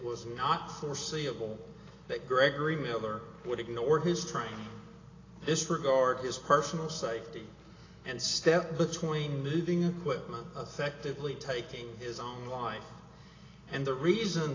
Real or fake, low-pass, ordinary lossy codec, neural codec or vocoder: real; 7.2 kHz; AAC, 32 kbps; none